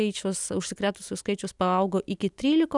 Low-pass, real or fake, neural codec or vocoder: 10.8 kHz; real; none